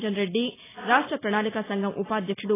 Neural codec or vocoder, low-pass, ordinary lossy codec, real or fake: none; 3.6 kHz; AAC, 16 kbps; real